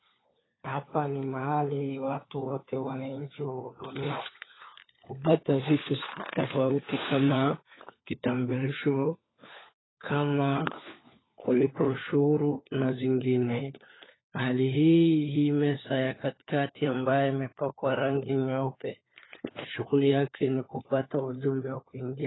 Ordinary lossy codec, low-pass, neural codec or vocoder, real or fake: AAC, 16 kbps; 7.2 kHz; codec, 16 kHz, 4 kbps, FunCodec, trained on LibriTTS, 50 frames a second; fake